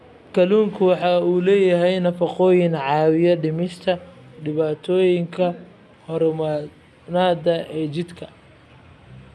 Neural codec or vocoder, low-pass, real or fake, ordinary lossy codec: none; none; real; none